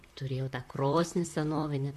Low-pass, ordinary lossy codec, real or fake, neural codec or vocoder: 14.4 kHz; MP3, 64 kbps; fake; vocoder, 44.1 kHz, 128 mel bands, Pupu-Vocoder